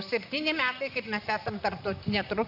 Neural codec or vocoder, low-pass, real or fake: vocoder, 44.1 kHz, 128 mel bands, Pupu-Vocoder; 5.4 kHz; fake